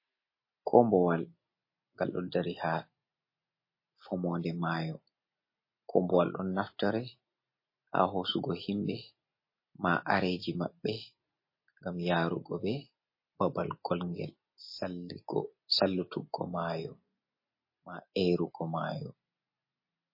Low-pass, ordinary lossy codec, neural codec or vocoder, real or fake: 5.4 kHz; MP3, 24 kbps; none; real